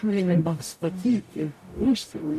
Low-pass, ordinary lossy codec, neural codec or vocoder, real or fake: 14.4 kHz; MP3, 64 kbps; codec, 44.1 kHz, 0.9 kbps, DAC; fake